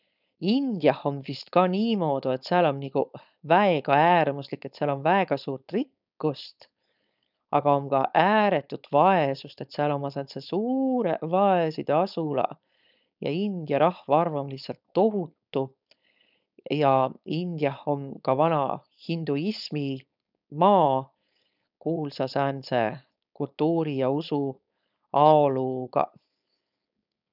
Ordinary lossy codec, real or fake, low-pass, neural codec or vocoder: none; fake; 5.4 kHz; codec, 16 kHz, 4.8 kbps, FACodec